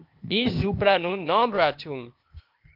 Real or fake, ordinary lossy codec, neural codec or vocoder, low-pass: fake; Opus, 24 kbps; codec, 16 kHz, 0.8 kbps, ZipCodec; 5.4 kHz